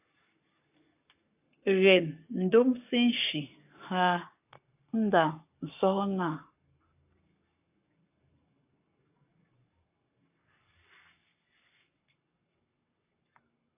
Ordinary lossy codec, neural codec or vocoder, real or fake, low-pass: AAC, 32 kbps; codec, 44.1 kHz, 7.8 kbps, Pupu-Codec; fake; 3.6 kHz